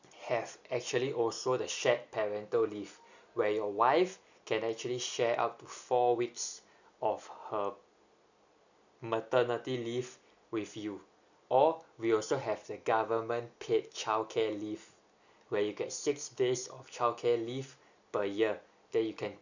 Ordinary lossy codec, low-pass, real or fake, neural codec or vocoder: none; 7.2 kHz; real; none